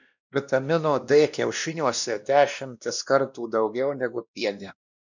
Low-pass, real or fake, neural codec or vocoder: 7.2 kHz; fake; codec, 16 kHz, 1 kbps, X-Codec, WavLM features, trained on Multilingual LibriSpeech